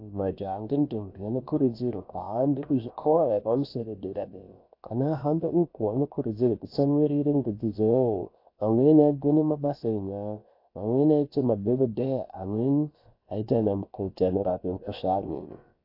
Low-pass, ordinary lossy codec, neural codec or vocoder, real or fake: 5.4 kHz; AAC, 32 kbps; codec, 16 kHz, about 1 kbps, DyCAST, with the encoder's durations; fake